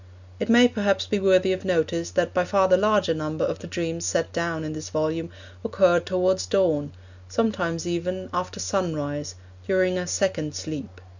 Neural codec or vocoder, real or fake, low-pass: none; real; 7.2 kHz